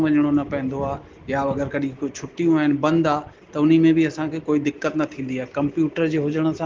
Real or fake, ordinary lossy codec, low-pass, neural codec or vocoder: real; Opus, 16 kbps; 7.2 kHz; none